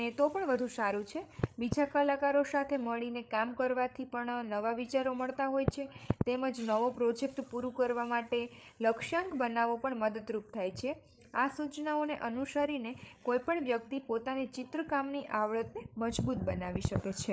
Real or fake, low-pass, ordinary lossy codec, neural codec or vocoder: fake; none; none; codec, 16 kHz, 8 kbps, FreqCodec, larger model